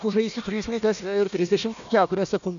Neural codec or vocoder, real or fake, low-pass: codec, 16 kHz, 1 kbps, FunCodec, trained on Chinese and English, 50 frames a second; fake; 7.2 kHz